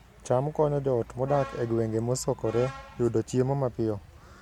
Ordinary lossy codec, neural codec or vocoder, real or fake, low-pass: MP3, 96 kbps; none; real; 19.8 kHz